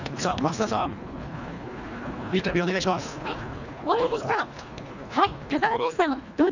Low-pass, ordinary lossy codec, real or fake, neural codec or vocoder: 7.2 kHz; none; fake; codec, 24 kHz, 1.5 kbps, HILCodec